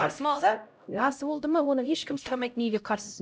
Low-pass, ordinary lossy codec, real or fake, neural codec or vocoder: none; none; fake; codec, 16 kHz, 0.5 kbps, X-Codec, HuBERT features, trained on LibriSpeech